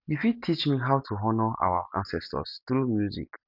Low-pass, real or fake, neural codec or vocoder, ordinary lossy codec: 5.4 kHz; real; none; none